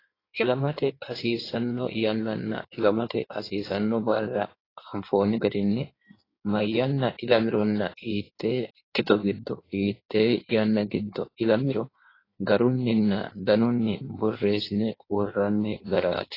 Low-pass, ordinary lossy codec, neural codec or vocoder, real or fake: 5.4 kHz; AAC, 24 kbps; codec, 16 kHz in and 24 kHz out, 1.1 kbps, FireRedTTS-2 codec; fake